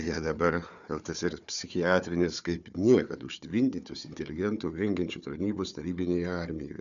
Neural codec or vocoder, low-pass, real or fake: codec, 16 kHz, 4 kbps, FunCodec, trained on Chinese and English, 50 frames a second; 7.2 kHz; fake